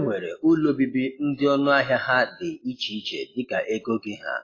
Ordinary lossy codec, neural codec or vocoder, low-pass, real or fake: AAC, 32 kbps; none; 7.2 kHz; real